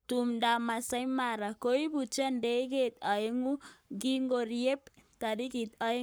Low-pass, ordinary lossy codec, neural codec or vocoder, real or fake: none; none; codec, 44.1 kHz, 7.8 kbps, Pupu-Codec; fake